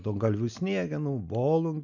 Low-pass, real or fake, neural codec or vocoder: 7.2 kHz; real; none